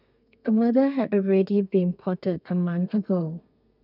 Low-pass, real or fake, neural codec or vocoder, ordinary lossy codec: 5.4 kHz; fake; codec, 32 kHz, 1.9 kbps, SNAC; none